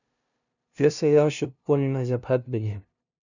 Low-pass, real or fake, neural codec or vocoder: 7.2 kHz; fake; codec, 16 kHz, 0.5 kbps, FunCodec, trained on LibriTTS, 25 frames a second